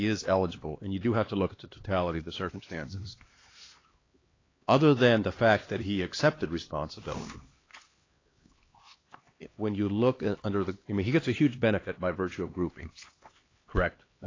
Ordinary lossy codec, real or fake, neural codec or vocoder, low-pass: AAC, 32 kbps; fake; codec, 16 kHz, 2 kbps, X-Codec, HuBERT features, trained on LibriSpeech; 7.2 kHz